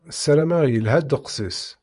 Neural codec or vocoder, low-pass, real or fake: vocoder, 24 kHz, 100 mel bands, Vocos; 10.8 kHz; fake